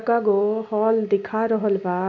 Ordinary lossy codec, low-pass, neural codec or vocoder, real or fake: none; 7.2 kHz; none; real